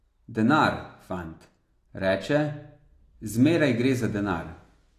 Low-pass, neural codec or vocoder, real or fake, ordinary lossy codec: 14.4 kHz; none; real; AAC, 48 kbps